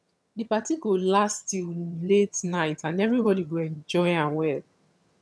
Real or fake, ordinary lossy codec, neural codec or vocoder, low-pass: fake; none; vocoder, 22.05 kHz, 80 mel bands, HiFi-GAN; none